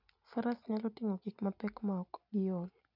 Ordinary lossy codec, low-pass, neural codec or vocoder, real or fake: none; 5.4 kHz; none; real